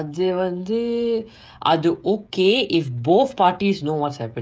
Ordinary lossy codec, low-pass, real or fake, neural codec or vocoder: none; none; fake; codec, 16 kHz, 16 kbps, FreqCodec, smaller model